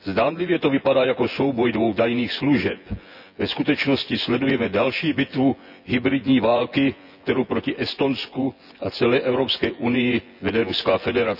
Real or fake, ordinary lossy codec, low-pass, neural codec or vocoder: fake; none; 5.4 kHz; vocoder, 24 kHz, 100 mel bands, Vocos